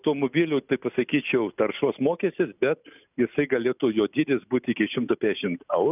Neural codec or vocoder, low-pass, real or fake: none; 3.6 kHz; real